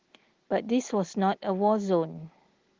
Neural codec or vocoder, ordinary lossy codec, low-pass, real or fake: none; Opus, 16 kbps; 7.2 kHz; real